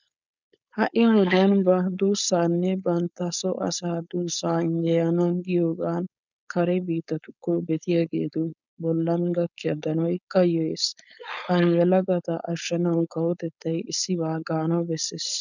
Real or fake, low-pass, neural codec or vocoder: fake; 7.2 kHz; codec, 16 kHz, 4.8 kbps, FACodec